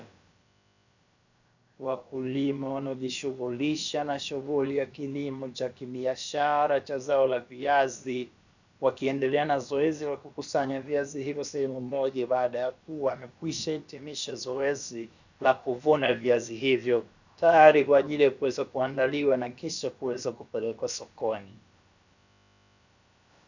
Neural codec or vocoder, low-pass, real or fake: codec, 16 kHz, about 1 kbps, DyCAST, with the encoder's durations; 7.2 kHz; fake